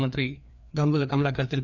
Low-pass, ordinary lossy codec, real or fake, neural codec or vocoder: 7.2 kHz; none; fake; codec, 16 kHz, 2 kbps, FreqCodec, larger model